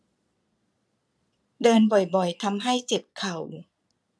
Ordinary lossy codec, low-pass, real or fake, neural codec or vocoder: none; none; fake; vocoder, 22.05 kHz, 80 mel bands, Vocos